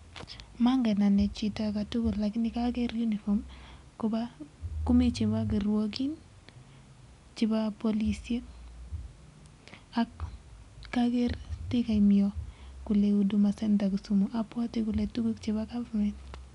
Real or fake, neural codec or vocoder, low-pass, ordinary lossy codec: real; none; 10.8 kHz; none